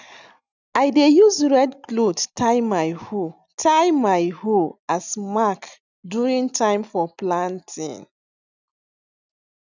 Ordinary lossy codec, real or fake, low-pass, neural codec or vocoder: none; real; 7.2 kHz; none